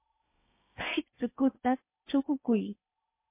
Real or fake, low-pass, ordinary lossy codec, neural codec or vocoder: fake; 3.6 kHz; MP3, 24 kbps; codec, 16 kHz in and 24 kHz out, 0.8 kbps, FocalCodec, streaming, 65536 codes